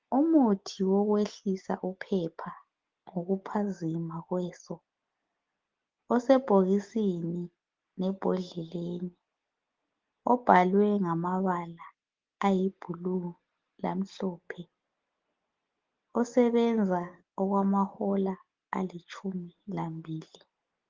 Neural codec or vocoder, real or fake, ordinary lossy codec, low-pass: none; real; Opus, 16 kbps; 7.2 kHz